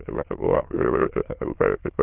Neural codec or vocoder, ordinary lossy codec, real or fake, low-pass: autoencoder, 22.05 kHz, a latent of 192 numbers a frame, VITS, trained on many speakers; Opus, 16 kbps; fake; 3.6 kHz